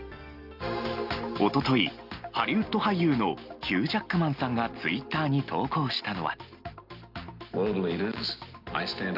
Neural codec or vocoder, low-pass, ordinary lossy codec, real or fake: none; 5.4 kHz; Opus, 24 kbps; real